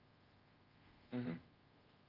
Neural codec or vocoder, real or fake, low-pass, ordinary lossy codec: codec, 24 kHz, 0.5 kbps, DualCodec; fake; 5.4 kHz; Opus, 24 kbps